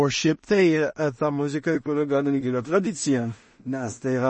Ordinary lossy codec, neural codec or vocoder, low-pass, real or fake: MP3, 32 kbps; codec, 16 kHz in and 24 kHz out, 0.4 kbps, LongCat-Audio-Codec, two codebook decoder; 10.8 kHz; fake